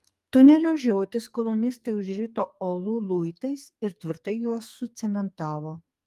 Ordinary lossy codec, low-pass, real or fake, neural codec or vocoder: Opus, 32 kbps; 14.4 kHz; fake; codec, 44.1 kHz, 2.6 kbps, SNAC